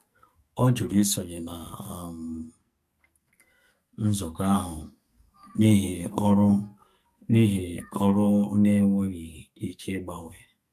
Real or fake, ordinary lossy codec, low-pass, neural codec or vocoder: fake; MP3, 96 kbps; 14.4 kHz; codec, 44.1 kHz, 2.6 kbps, SNAC